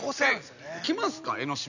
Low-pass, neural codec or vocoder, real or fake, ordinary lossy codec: 7.2 kHz; none; real; none